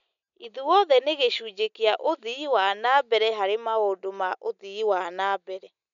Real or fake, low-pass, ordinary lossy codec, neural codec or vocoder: real; 7.2 kHz; none; none